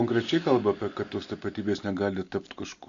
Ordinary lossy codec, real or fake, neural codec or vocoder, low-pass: AAC, 64 kbps; real; none; 7.2 kHz